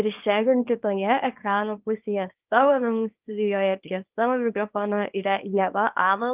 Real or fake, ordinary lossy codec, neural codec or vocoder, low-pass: fake; Opus, 24 kbps; codec, 24 kHz, 0.9 kbps, WavTokenizer, small release; 3.6 kHz